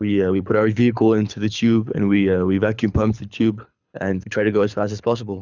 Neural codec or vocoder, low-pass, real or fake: codec, 24 kHz, 6 kbps, HILCodec; 7.2 kHz; fake